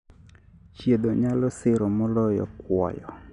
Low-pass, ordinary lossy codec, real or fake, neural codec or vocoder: 10.8 kHz; none; real; none